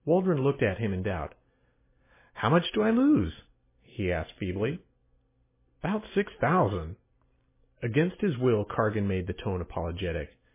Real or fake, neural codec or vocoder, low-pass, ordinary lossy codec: real; none; 3.6 kHz; MP3, 16 kbps